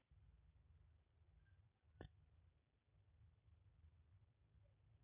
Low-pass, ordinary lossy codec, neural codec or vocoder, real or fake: 3.6 kHz; none; none; real